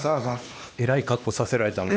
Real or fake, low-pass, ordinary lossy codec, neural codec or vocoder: fake; none; none; codec, 16 kHz, 2 kbps, X-Codec, HuBERT features, trained on LibriSpeech